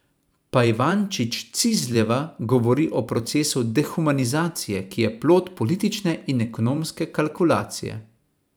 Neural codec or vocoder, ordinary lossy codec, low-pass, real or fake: vocoder, 44.1 kHz, 128 mel bands every 512 samples, BigVGAN v2; none; none; fake